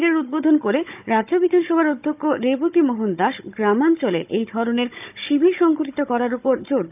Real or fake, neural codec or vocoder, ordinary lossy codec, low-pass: fake; codec, 16 kHz, 16 kbps, FunCodec, trained on Chinese and English, 50 frames a second; none; 3.6 kHz